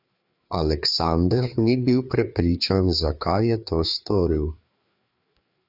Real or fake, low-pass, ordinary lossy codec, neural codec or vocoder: fake; 5.4 kHz; Opus, 64 kbps; codec, 16 kHz, 4 kbps, FreqCodec, larger model